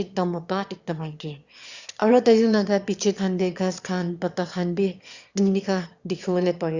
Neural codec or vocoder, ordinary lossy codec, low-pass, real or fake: autoencoder, 22.05 kHz, a latent of 192 numbers a frame, VITS, trained on one speaker; Opus, 64 kbps; 7.2 kHz; fake